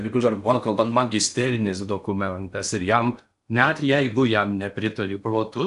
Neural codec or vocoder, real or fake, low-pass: codec, 16 kHz in and 24 kHz out, 0.6 kbps, FocalCodec, streaming, 4096 codes; fake; 10.8 kHz